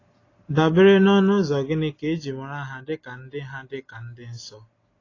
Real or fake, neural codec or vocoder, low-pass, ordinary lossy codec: real; none; 7.2 kHz; AAC, 32 kbps